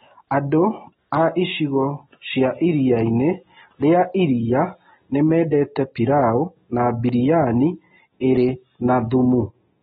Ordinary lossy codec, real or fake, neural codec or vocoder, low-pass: AAC, 16 kbps; real; none; 19.8 kHz